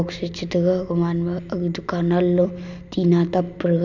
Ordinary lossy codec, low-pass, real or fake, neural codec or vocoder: none; 7.2 kHz; real; none